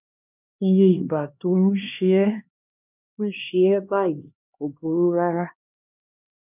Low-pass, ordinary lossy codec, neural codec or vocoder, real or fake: 3.6 kHz; none; codec, 16 kHz, 2 kbps, X-Codec, HuBERT features, trained on LibriSpeech; fake